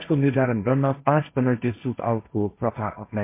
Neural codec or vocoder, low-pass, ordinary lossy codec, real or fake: codec, 16 kHz, 1.1 kbps, Voila-Tokenizer; 3.6 kHz; MP3, 24 kbps; fake